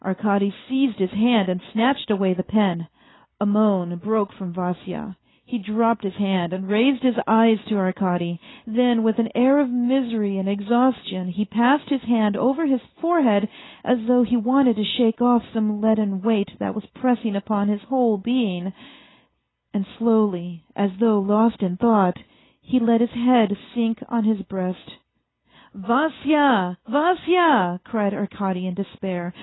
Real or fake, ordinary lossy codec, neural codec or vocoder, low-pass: real; AAC, 16 kbps; none; 7.2 kHz